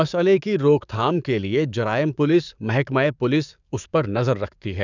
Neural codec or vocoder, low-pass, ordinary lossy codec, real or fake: codec, 44.1 kHz, 7.8 kbps, DAC; 7.2 kHz; none; fake